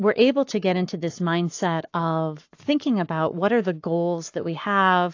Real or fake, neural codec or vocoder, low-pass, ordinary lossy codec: fake; codec, 44.1 kHz, 7.8 kbps, Pupu-Codec; 7.2 kHz; AAC, 48 kbps